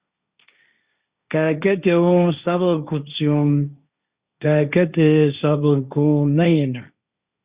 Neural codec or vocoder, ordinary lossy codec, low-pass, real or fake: codec, 16 kHz, 1.1 kbps, Voila-Tokenizer; Opus, 64 kbps; 3.6 kHz; fake